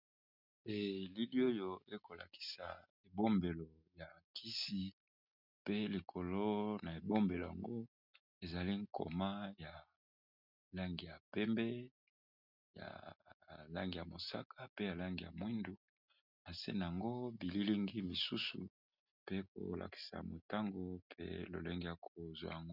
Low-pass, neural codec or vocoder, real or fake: 5.4 kHz; none; real